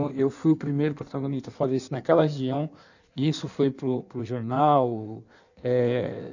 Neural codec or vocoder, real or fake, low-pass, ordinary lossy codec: codec, 16 kHz in and 24 kHz out, 1.1 kbps, FireRedTTS-2 codec; fake; 7.2 kHz; none